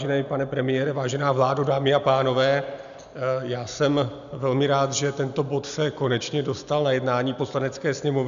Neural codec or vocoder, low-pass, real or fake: none; 7.2 kHz; real